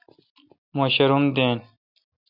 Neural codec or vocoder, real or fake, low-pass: none; real; 5.4 kHz